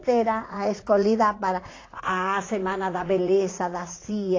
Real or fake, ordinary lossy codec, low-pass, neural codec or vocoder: fake; AAC, 32 kbps; 7.2 kHz; vocoder, 44.1 kHz, 80 mel bands, Vocos